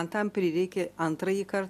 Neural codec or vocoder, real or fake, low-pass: none; real; 14.4 kHz